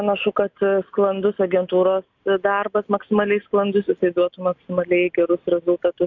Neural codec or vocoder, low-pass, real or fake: none; 7.2 kHz; real